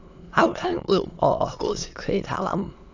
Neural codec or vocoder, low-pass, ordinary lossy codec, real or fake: autoencoder, 22.05 kHz, a latent of 192 numbers a frame, VITS, trained on many speakers; 7.2 kHz; AAC, 48 kbps; fake